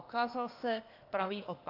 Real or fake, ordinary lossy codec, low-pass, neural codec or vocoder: fake; AAC, 32 kbps; 5.4 kHz; codec, 16 kHz, 0.8 kbps, ZipCodec